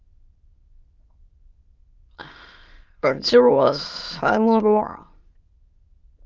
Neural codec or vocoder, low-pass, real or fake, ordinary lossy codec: autoencoder, 22.05 kHz, a latent of 192 numbers a frame, VITS, trained on many speakers; 7.2 kHz; fake; Opus, 24 kbps